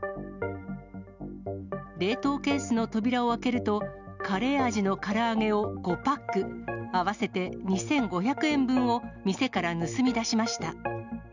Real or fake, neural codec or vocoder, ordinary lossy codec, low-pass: real; none; none; 7.2 kHz